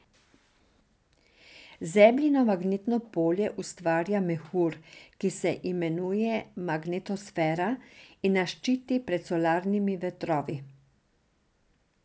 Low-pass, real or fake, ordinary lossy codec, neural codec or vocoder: none; real; none; none